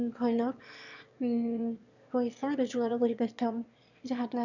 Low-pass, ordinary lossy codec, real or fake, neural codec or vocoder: 7.2 kHz; none; fake; autoencoder, 22.05 kHz, a latent of 192 numbers a frame, VITS, trained on one speaker